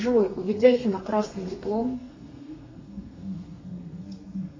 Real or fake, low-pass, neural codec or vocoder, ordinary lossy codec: fake; 7.2 kHz; codec, 32 kHz, 1.9 kbps, SNAC; MP3, 32 kbps